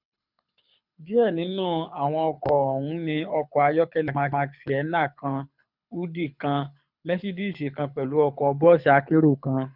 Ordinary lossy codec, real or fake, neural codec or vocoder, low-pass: none; fake; codec, 24 kHz, 6 kbps, HILCodec; 5.4 kHz